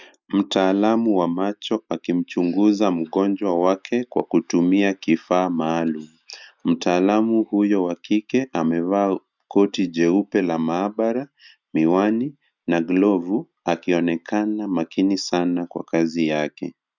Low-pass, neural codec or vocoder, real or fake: 7.2 kHz; none; real